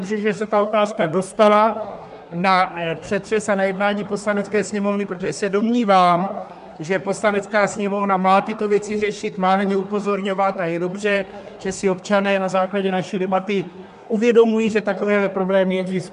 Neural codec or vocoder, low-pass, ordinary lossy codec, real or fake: codec, 24 kHz, 1 kbps, SNAC; 10.8 kHz; AAC, 96 kbps; fake